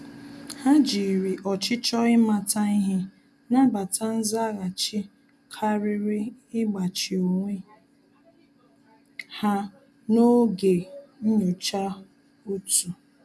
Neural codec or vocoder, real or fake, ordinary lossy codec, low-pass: none; real; none; none